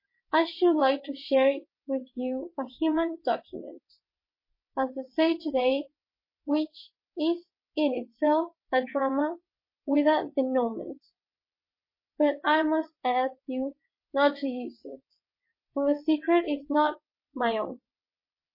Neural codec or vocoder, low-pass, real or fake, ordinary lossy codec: vocoder, 22.05 kHz, 80 mel bands, WaveNeXt; 5.4 kHz; fake; MP3, 24 kbps